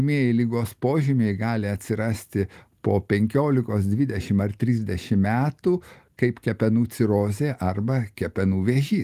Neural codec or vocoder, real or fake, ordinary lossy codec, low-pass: none; real; Opus, 24 kbps; 14.4 kHz